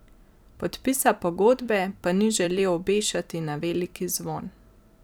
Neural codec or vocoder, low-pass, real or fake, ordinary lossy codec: vocoder, 44.1 kHz, 128 mel bands every 256 samples, BigVGAN v2; none; fake; none